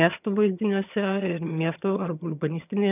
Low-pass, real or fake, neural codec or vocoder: 3.6 kHz; fake; vocoder, 22.05 kHz, 80 mel bands, HiFi-GAN